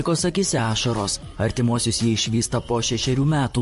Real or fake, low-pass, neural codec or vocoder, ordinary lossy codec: fake; 14.4 kHz; vocoder, 44.1 kHz, 128 mel bands, Pupu-Vocoder; MP3, 48 kbps